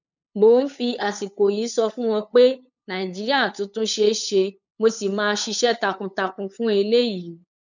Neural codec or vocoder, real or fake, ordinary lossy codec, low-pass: codec, 16 kHz, 8 kbps, FunCodec, trained on LibriTTS, 25 frames a second; fake; none; 7.2 kHz